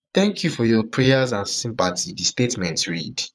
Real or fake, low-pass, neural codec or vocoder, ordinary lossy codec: fake; none; vocoder, 22.05 kHz, 80 mel bands, Vocos; none